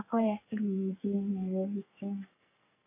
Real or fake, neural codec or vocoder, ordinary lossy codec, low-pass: fake; codec, 44.1 kHz, 2.6 kbps, SNAC; AAC, 32 kbps; 3.6 kHz